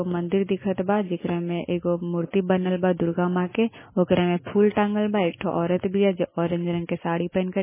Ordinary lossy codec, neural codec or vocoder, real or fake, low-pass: MP3, 16 kbps; none; real; 3.6 kHz